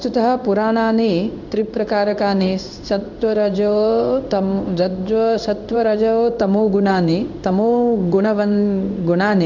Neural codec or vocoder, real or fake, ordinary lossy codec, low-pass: codec, 16 kHz in and 24 kHz out, 1 kbps, XY-Tokenizer; fake; none; 7.2 kHz